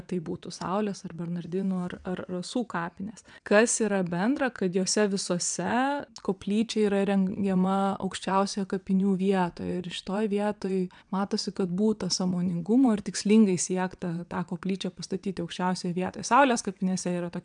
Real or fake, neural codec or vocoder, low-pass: fake; vocoder, 22.05 kHz, 80 mel bands, WaveNeXt; 9.9 kHz